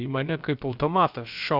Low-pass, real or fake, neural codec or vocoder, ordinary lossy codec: 5.4 kHz; fake; codec, 16 kHz, about 1 kbps, DyCAST, with the encoder's durations; Opus, 64 kbps